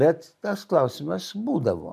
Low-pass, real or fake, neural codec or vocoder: 14.4 kHz; real; none